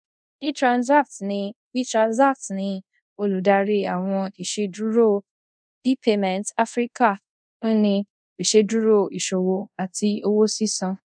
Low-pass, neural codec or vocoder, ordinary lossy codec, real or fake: 9.9 kHz; codec, 24 kHz, 0.5 kbps, DualCodec; none; fake